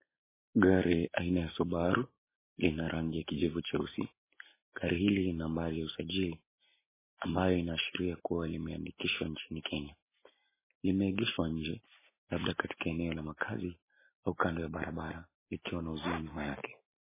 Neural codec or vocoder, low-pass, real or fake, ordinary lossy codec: none; 3.6 kHz; real; MP3, 16 kbps